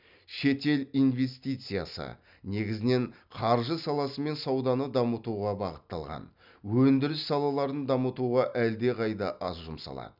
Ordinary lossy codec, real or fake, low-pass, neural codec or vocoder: none; real; 5.4 kHz; none